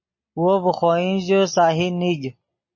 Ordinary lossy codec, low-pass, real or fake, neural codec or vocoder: MP3, 32 kbps; 7.2 kHz; real; none